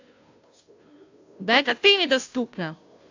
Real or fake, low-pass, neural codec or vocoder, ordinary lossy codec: fake; 7.2 kHz; codec, 16 kHz, 0.5 kbps, FunCodec, trained on Chinese and English, 25 frames a second; AAC, 48 kbps